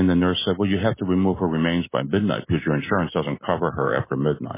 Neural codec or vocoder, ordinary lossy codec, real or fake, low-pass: none; MP3, 16 kbps; real; 3.6 kHz